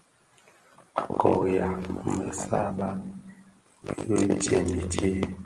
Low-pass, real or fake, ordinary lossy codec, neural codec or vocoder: 10.8 kHz; real; Opus, 24 kbps; none